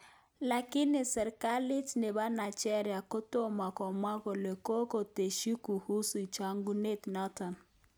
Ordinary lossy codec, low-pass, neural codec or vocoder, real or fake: none; none; none; real